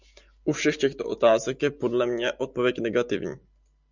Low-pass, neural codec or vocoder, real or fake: 7.2 kHz; none; real